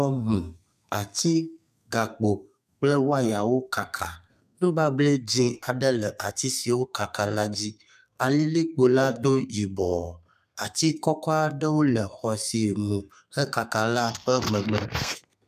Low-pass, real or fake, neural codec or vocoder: 14.4 kHz; fake; codec, 32 kHz, 1.9 kbps, SNAC